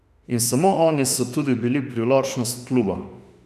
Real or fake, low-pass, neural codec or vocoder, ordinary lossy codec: fake; 14.4 kHz; autoencoder, 48 kHz, 32 numbers a frame, DAC-VAE, trained on Japanese speech; none